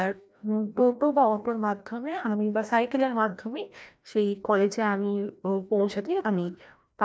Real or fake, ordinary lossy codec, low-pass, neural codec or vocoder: fake; none; none; codec, 16 kHz, 1 kbps, FreqCodec, larger model